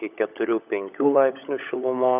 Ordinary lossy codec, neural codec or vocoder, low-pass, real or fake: AAC, 32 kbps; codec, 16 kHz, 16 kbps, FunCodec, trained on LibriTTS, 50 frames a second; 3.6 kHz; fake